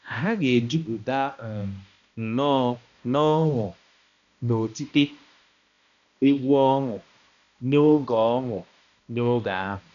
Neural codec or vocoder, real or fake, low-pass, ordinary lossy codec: codec, 16 kHz, 1 kbps, X-Codec, HuBERT features, trained on balanced general audio; fake; 7.2 kHz; none